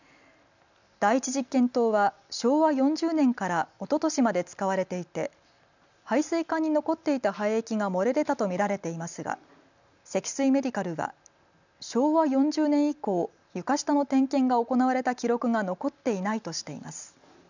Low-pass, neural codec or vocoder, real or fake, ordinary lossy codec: 7.2 kHz; none; real; none